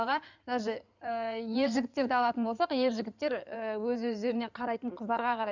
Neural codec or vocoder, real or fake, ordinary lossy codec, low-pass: codec, 16 kHz in and 24 kHz out, 2.2 kbps, FireRedTTS-2 codec; fake; none; 7.2 kHz